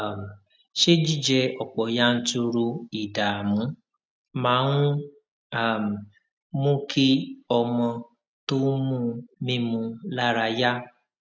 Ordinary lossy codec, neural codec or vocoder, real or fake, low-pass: none; none; real; none